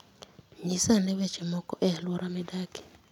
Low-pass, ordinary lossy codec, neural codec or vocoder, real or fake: 19.8 kHz; none; none; real